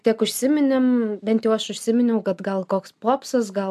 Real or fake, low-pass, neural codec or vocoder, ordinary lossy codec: real; 14.4 kHz; none; AAC, 96 kbps